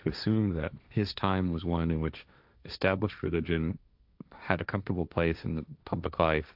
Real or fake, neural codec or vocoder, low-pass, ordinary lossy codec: fake; codec, 16 kHz, 1.1 kbps, Voila-Tokenizer; 5.4 kHz; AAC, 48 kbps